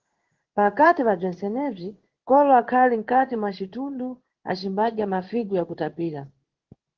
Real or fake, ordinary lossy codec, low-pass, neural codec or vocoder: fake; Opus, 16 kbps; 7.2 kHz; codec, 16 kHz in and 24 kHz out, 1 kbps, XY-Tokenizer